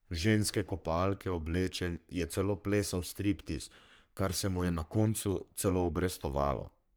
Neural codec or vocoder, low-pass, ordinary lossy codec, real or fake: codec, 44.1 kHz, 3.4 kbps, Pupu-Codec; none; none; fake